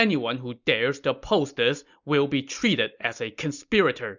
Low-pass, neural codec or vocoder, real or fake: 7.2 kHz; none; real